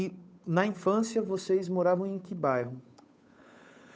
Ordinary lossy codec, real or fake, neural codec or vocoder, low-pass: none; fake; codec, 16 kHz, 8 kbps, FunCodec, trained on Chinese and English, 25 frames a second; none